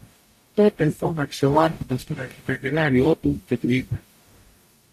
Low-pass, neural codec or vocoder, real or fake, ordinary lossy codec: 14.4 kHz; codec, 44.1 kHz, 0.9 kbps, DAC; fake; AAC, 64 kbps